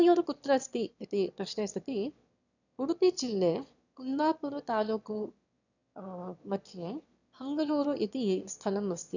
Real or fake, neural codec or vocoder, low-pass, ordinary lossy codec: fake; autoencoder, 22.05 kHz, a latent of 192 numbers a frame, VITS, trained on one speaker; 7.2 kHz; none